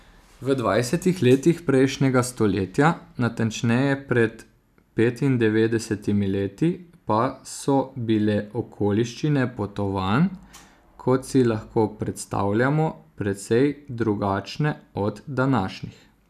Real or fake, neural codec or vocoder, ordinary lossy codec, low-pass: real; none; none; 14.4 kHz